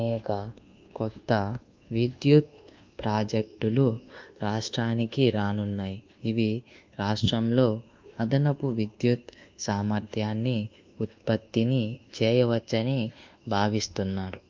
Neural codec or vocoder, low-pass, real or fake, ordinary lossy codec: codec, 24 kHz, 1.2 kbps, DualCodec; 7.2 kHz; fake; Opus, 32 kbps